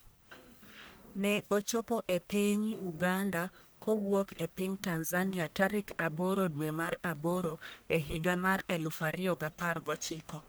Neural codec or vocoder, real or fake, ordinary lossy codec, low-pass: codec, 44.1 kHz, 1.7 kbps, Pupu-Codec; fake; none; none